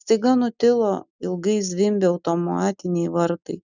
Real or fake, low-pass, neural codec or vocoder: real; 7.2 kHz; none